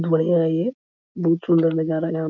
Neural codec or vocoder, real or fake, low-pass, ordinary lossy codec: none; real; 7.2 kHz; none